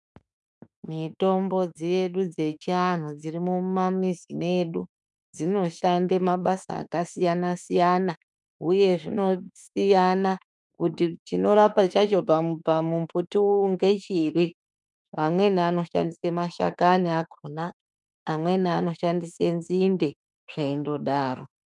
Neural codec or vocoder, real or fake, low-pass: autoencoder, 48 kHz, 32 numbers a frame, DAC-VAE, trained on Japanese speech; fake; 10.8 kHz